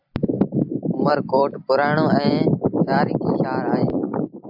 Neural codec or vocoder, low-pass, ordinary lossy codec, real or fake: vocoder, 44.1 kHz, 128 mel bands every 256 samples, BigVGAN v2; 5.4 kHz; AAC, 48 kbps; fake